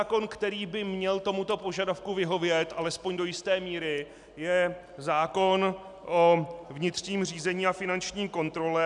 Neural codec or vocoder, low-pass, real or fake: none; 10.8 kHz; real